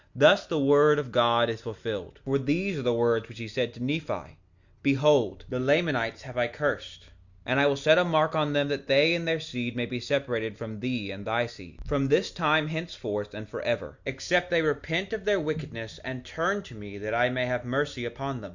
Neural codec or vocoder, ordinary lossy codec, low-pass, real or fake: none; Opus, 64 kbps; 7.2 kHz; real